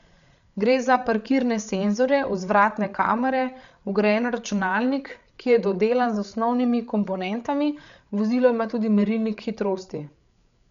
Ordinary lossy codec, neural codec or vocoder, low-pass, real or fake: none; codec, 16 kHz, 8 kbps, FreqCodec, larger model; 7.2 kHz; fake